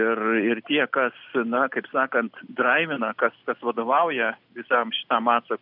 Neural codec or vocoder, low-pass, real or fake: none; 5.4 kHz; real